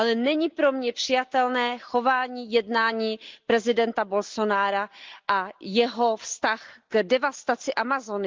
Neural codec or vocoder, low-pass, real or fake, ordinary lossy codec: none; 7.2 kHz; real; Opus, 32 kbps